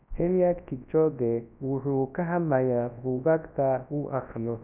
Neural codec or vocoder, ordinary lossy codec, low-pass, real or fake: codec, 24 kHz, 0.9 kbps, WavTokenizer, large speech release; none; 3.6 kHz; fake